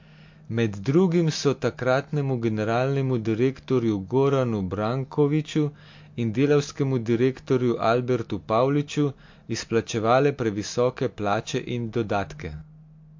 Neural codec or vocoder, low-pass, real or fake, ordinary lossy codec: none; 7.2 kHz; real; MP3, 48 kbps